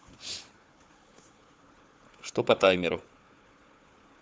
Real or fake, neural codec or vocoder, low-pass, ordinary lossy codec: fake; codec, 16 kHz, 4 kbps, FunCodec, trained on Chinese and English, 50 frames a second; none; none